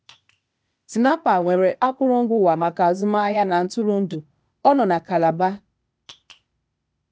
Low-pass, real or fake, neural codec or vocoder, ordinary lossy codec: none; fake; codec, 16 kHz, 0.8 kbps, ZipCodec; none